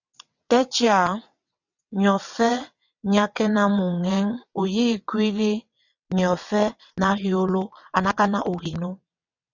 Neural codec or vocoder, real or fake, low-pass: vocoder, 22.05 kHz, 80 mel bands, WaveNeXt; fake; 7.2 kHz